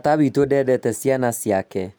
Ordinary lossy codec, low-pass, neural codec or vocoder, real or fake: none; none; none; real